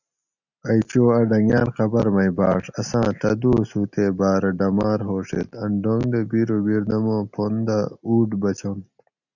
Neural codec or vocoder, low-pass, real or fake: none; 7.2 kHz; real